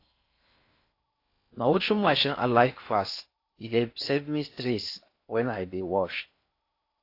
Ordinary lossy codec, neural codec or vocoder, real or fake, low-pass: AAC, 32 kbps; codec, 16 kHz in and 24 kHz out, 0.6 kbps, FocalCodec, streaming, 4096 codes; fake; 5.4 kHz